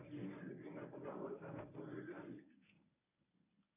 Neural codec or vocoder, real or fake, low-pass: codec, 24 kHz, 0.9 kbps, WavTokenizer, medium speech release version 1; fake; 3.6 kHz